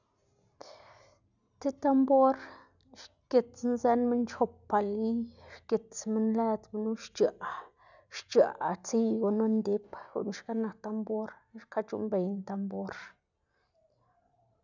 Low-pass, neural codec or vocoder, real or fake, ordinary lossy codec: 7.2 kHz; none; real; none